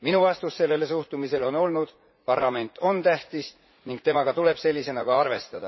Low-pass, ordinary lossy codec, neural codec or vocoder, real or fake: 7.2 kHz; MP3, 24 kbps; vocoder, 44.1 kHz, 80 mel bands, Vocos; fake